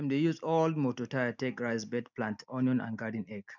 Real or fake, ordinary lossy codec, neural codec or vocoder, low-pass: real; none; none; 7.2 kHz